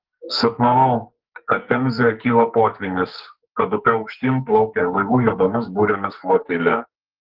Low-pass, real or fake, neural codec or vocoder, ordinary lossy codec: 5.4 kHz; fake; codec, 44.1 kHz, 2.6 kbps, SNAC; Opus, 16 kbps